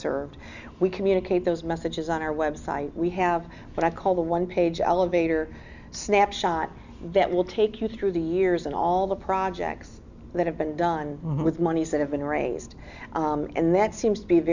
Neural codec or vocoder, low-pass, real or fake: none; 7.2 kHz; real